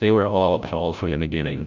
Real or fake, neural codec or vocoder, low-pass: fake; codec, 16 kHz, 0.5 kbps, FreqCodec, larger model; 7.2 kHz